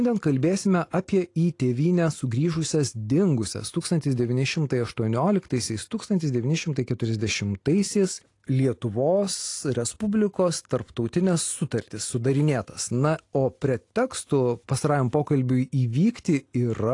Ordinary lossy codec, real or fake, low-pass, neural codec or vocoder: AAC, 48 kbps; real; 10.8 kHz; none